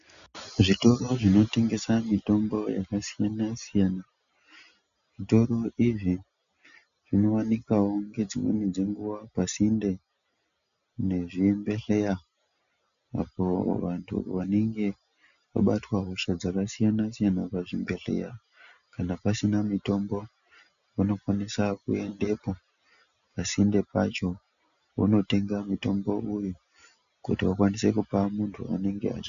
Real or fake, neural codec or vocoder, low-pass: real; none; 7.2 kHz